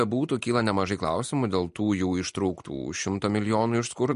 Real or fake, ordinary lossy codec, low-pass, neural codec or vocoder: real; MP3, 48 kbps; 10.8 kHz; none